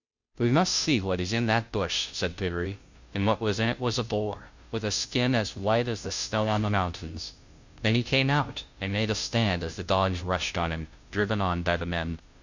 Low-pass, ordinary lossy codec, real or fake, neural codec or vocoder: 7.2 kHz; Opus, 64 kbps; fake; codec, 16 kHz, 0.5 kbps, FunCodec, trained on Chinese and English, 25 frames a second